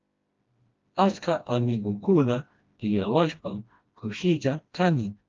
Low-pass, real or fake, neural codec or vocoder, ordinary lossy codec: 7.2 kHz; fake; codec, 16 kHz, 1 kbps, FreqCodec, smaller model; Opus, 24 kbps